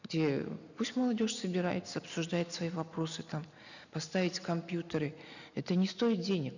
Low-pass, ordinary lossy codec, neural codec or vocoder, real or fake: 7.2 kHz; none; none; real